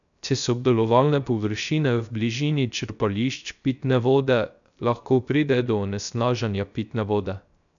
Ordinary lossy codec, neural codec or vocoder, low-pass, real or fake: none; codec, 16 kHz, 0.3 kbps, FocalCodec; 7.2 kHz; fake